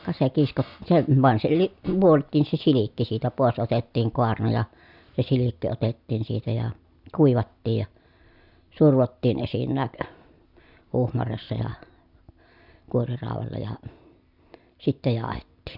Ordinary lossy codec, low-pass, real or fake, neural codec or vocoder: none; 5.4 kHz; real; none